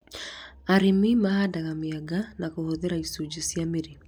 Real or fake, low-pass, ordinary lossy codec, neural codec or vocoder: real; 19.8 kHz; none; none